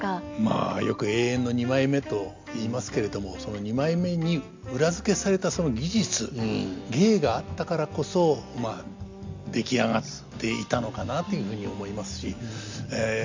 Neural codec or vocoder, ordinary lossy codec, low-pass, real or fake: none; MP3, 64 kbps; 7.2 kHz; real